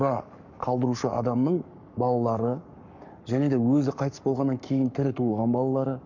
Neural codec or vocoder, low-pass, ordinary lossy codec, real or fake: codec, 44.1 kHz, 7.8 kbps, Pupu-Codec; 7.2 kHz; none; fake